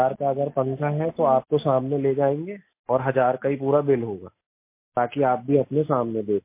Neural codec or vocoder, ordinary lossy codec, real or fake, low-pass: none; MP3, 24 kbps; real; 3.6 kHz